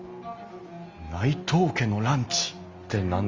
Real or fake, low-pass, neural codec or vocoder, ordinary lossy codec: real; 7.2 kHz; none; Opus, 32 kbps